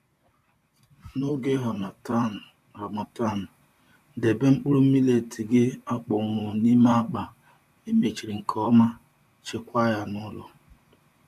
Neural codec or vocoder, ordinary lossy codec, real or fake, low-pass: vocoder, 44.1 kHz, 128 mel bands, Pupu-Vocoder; none; fake; 14.4 kHz